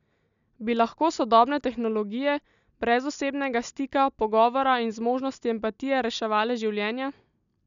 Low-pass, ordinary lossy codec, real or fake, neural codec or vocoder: 7.2 kHz; none; real; none